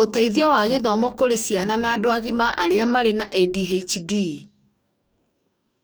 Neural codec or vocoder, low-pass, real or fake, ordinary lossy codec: codec, 44.1 kHz, 2.6 kbps, DAC; none; fake; none